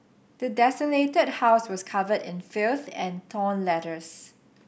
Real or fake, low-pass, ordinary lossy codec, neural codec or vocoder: real; none; none; none